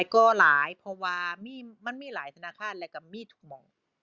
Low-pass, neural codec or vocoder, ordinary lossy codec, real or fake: 7.2 kHz; none; Opus, 64 kbps; real